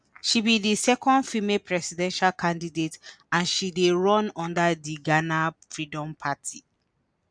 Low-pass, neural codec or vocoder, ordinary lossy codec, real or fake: 9.9 kHz; none; none; real